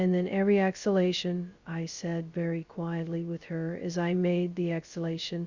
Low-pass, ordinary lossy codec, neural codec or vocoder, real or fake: 7.2 kHz; Opus, 64 kbps; codec, 16 kHz, 0.2 kbps, FocalCodec; fake